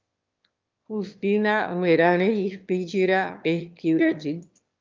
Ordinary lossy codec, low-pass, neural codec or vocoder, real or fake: Opus, 24 kbps; 7.2 kHz; autoencoder, 22.05 kHz, a latent of 192 numbers a frame, VITS, trained on one speaker; fake